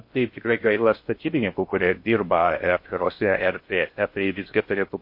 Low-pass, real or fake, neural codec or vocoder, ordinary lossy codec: 5.4 kHz; fake; codec, 16 kHz in and 24 kHz out, 0.6 kbps, FocalCodec, streaming, 2048 codes; MP3, 32 kbps